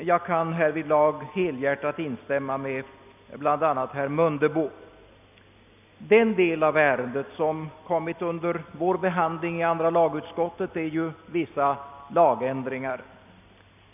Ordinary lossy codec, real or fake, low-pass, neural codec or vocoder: none; real; 3.6 kHz; none